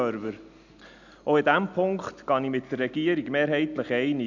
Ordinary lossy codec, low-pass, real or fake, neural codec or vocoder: none; 7.2 kHz; real; none